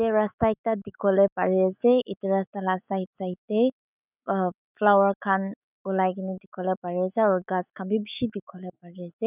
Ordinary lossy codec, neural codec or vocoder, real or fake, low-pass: none; autoencoder, 48 kHz, 128 numbers a frame, DAC-VAE, trained on Japanese speech; fake; 3.6 kHz